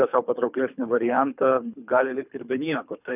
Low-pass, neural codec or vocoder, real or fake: 3.6 kHz; codec, 24 kHz, 3 kbps, HILCodec; fake